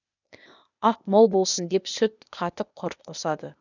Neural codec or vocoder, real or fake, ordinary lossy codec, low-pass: codec, 16 kHz, 0.8 kbps, ZipCodec; fake; none; 7.2 kHz